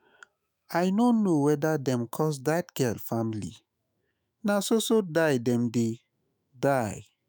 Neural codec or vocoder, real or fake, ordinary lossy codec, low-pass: autoencoder, 48 kHz, 128 numbers a frame, DAC-VAE, trained on Japanese speech; fake; none; none